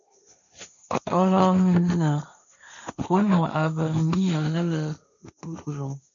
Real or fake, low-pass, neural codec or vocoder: fake; 7.2 kHz; codec, 16 kHz, 1.1 kbps, Voila-Tokenizer